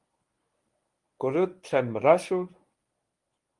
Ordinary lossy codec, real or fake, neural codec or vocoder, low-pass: Opus, 32 kbps; fake; codec, 24 kHz, 0.9 kbps, WavTokenizer, medium speech release version 1; 10.8 kHz